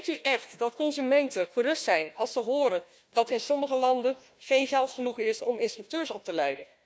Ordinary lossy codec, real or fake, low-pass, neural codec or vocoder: none; fake; none; codec, 16 kHz, 1 kbps, FunCodec, trained on Chinese and English, 50 frames a second